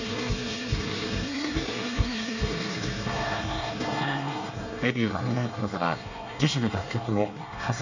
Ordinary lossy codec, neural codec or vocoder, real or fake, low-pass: none; codec, 24 kHz, 1 kbps, SNAC; fake; 7.2 kHz